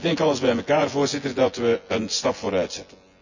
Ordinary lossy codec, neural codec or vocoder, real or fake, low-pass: none; vocoder, 24 kHz, 100 mel bands, Vocos; fake; 7.2 kHz